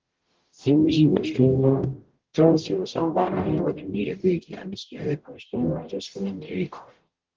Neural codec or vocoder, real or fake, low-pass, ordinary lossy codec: codec, 44.1 kHz, 0.9 kbps, DAC; fake; 7.2 kHz; Opus, 16 kbps